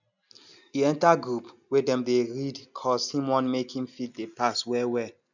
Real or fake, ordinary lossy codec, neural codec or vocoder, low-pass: real; none; none; 7.2 kHz